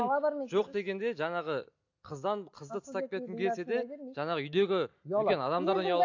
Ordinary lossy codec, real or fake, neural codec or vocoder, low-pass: AAC, 48 kbps; real; none; 7.2 kHz